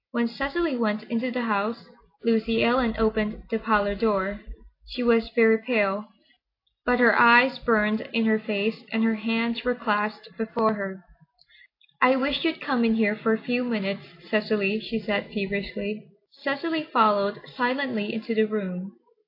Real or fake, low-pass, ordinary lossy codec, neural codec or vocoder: real; 5.4 kHz; AAC, 32 kbps; none